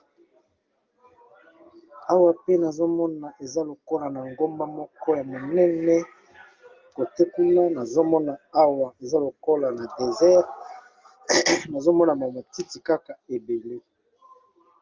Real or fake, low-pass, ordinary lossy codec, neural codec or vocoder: real; 7.2 kHz; Opus, 32 kbps; none